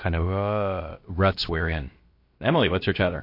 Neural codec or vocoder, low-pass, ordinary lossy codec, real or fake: codec, 16 kHz, about 1 kbps, DyCAST, with the encoder's durations; 5.4 kHz; MP3, 32 kbps; fake